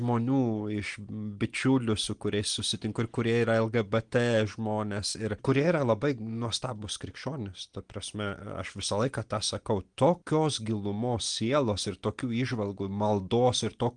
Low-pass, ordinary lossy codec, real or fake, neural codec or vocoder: 9.9 kHz; Opus, 24 kbps; real; none